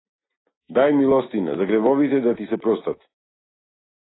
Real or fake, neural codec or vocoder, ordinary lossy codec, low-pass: real; none; AAC, 16 kbps; 7.2 kHz